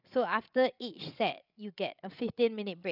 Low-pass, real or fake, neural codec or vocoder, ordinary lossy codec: 5.4 kHz; fake; codec, 16 kHz, 16 kbps, FreqCodec, larger model; none